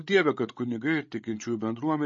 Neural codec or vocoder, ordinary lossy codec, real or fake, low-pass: codec, 16 kHz, 16 kbps, FreqCodec, larger model; MP3, 32 kbps; fake; 7.2 kHz